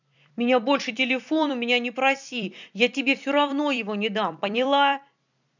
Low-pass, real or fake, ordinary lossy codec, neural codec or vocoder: 7.2 kHz; fake; none; vocoder, 44.1 kHz, 128 mel bands every 256 samples, BigVGAN v2